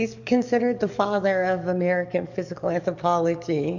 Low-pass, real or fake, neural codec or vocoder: 7.2 kHz; fake; codec, 44.1 kHz, 7.8 kbps, DAC